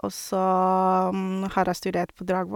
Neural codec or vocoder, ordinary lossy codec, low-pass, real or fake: none; none; 19.8 kHz; real